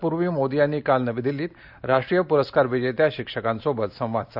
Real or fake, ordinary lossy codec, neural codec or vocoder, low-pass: real; none; none; 5.4 kHz